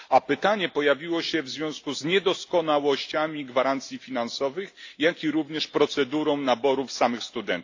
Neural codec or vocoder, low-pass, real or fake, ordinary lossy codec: none; 7.2 kHz; real; AAC, 48 kbps